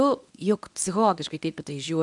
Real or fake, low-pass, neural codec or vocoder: fake; 10.8 kHz; codec, 24 kHz, 0.9 kbps, WavTokenizer, medium speech release version 1